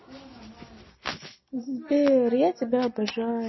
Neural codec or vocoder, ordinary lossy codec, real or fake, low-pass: none; MP3, 24 kbps; real; 7.2 kHz